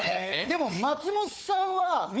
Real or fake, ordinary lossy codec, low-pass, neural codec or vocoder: fake; none; none; codec, 16 kHz, 4 kbps, FunCodec, trained on Chinese and English, 50 frames a second